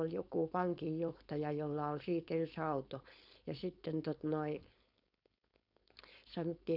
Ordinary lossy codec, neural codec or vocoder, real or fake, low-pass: none; codec, 16 kHz, 4.8 kbps, FACodec; fake; 5.4 kHz